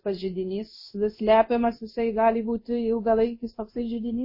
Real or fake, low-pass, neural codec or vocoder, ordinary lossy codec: fake; 5.4 kHz; codec, 16 kHz in and 24 kHz out, 1 kbps, XY-Tokenizer; MP3, 24 kbps